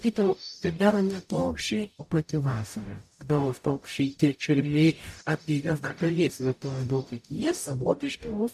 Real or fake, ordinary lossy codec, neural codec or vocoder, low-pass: fake; AAC, 96 kbps; codec, 44.1 kHz, 0.9 kbps, DAC; 14.4 kHz